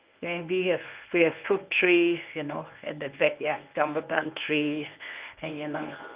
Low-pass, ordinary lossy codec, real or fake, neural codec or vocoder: 3.6 kHz; Opus, 32 kbps; fake; codec, 24 kHz, 0.9 kbps, WavTokenizer, medium speech release version 1